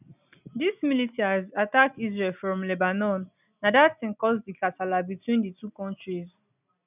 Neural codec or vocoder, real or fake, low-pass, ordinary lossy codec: none; real; 3.6 kHz; none